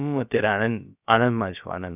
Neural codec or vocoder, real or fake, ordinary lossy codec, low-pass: codec, 16 kHz, about 1 kbps, DyCAST, with the encoder's durations; fake; none; 3.6 kHz